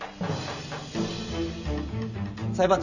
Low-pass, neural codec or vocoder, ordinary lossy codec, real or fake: 7.2 kHz; none; none; real